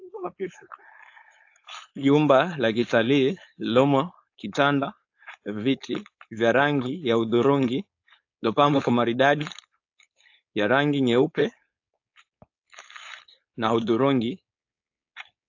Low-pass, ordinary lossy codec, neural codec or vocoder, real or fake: 7.2 kHz; AAC, 48 kbps; codec, 16 kHz, 4.8 kbps, FACodec; fake